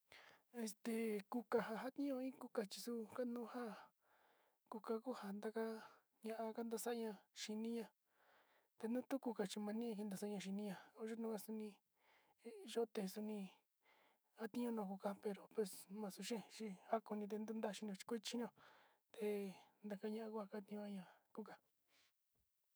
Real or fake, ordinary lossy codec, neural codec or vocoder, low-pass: fake; none; autoencoder, 48 kHz, 128 numbers a frame, DAC-VAE, trained on Japanese speech; none